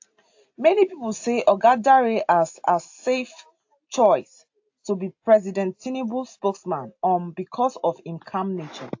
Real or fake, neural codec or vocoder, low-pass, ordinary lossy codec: real; none; 7.2 kHz; AAC, 48 kbps